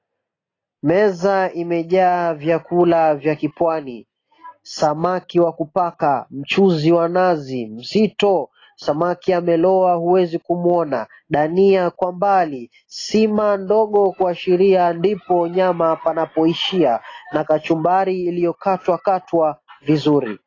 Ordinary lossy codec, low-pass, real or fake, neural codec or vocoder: AAC, 32 kbps; 7.2 kHz; real; none